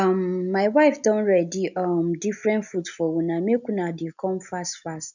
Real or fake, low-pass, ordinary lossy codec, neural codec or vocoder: real; 7.2 kHz; none; none